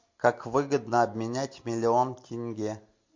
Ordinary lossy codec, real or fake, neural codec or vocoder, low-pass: MP3, 48 kbps; real; none; 7.2 kHz